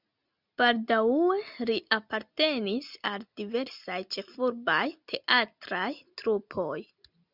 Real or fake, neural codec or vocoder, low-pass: real; none; 5.4 kHz